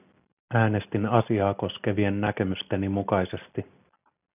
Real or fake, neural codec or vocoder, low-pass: real; none; 3.6 kHz